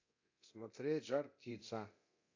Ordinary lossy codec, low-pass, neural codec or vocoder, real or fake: AAC, 48 kbps; 7.2 kHz; codec, 24 kHz, 0.9 kbps, DualCodec; fake